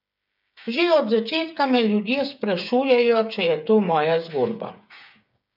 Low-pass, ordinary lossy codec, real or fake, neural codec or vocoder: 5.4 kHz; none; fake; codec, 16 kHz, 8 kbps, FreqCodec, smaller model